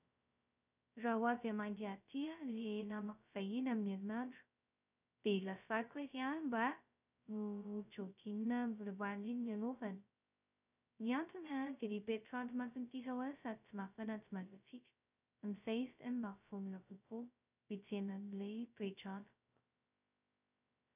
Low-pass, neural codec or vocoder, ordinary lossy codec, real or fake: 3.6 kHz; codec, 16 kHz, 0.2 kbps, FocalCodec; none; fake